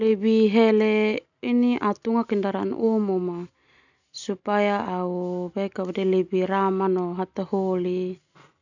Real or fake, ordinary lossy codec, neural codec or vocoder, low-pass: real; none; none; 7.2 kHz